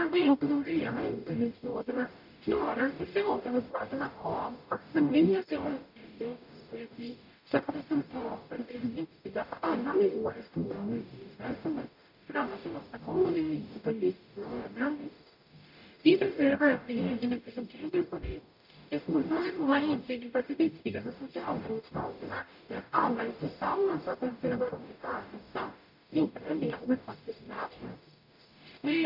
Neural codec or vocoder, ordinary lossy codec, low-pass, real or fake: codec, 44.1 kHz, 0.9 kbps, DAC; none; 5.4 kHz; fake